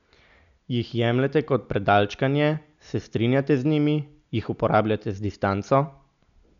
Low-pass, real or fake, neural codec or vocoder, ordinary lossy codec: 7.2 kHz; real; none; none